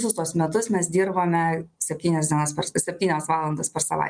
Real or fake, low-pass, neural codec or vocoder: real; 9.9 kHz; none